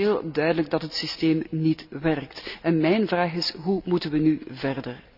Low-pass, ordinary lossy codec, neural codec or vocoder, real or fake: 5.4 kHz; none; none; real